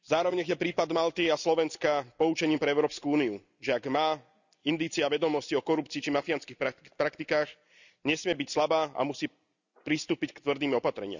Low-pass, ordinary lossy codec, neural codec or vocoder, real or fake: 7.2 kHz; none; none; real